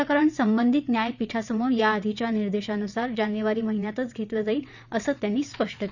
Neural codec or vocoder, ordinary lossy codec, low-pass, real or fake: vocoder, 22.05 kHz, 80 mel bands, WaveNeXt; none; 7.2 kHz; fake